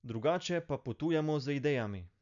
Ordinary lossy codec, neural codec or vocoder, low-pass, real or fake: none; none; 7.2 kHz; real